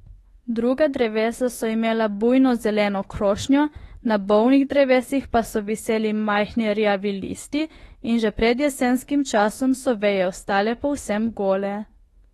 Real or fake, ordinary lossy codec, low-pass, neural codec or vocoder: fake; AAC, 32 kbps; 19.8 kHz; autoencoder, 48 kHz, 32 numbers a frame, DAC-VAE, trained on Japanese speech